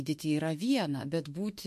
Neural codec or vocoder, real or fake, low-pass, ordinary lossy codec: autoencoder, 48 kHz, 32 numbers a frame, DAC-VAE, trained on Japanese speech; fake; 14.4 kHz; MP3, 64 kbps